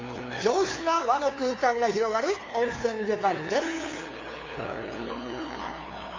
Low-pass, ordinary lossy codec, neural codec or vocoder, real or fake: 7.2 kHz; AAC, 32 kbps; codec, 16 kHz, 2 kbps, FunCodec, trained on LibriTTS, 25 frames a second; fake